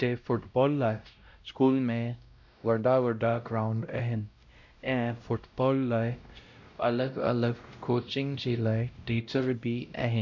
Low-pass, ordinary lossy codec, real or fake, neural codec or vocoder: 7.2 kHz; none; fake; codec, 16 kHz, 0.5 kbps, X-Codec, WavLM features, trained on Multilingual LibriSpeech